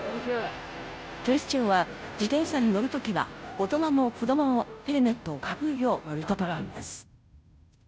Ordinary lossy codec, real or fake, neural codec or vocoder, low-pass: none; fake; codec, 16 kHz, 0.5 kbps, FunCodec, trained on Chinese and English, 25 frames a second; none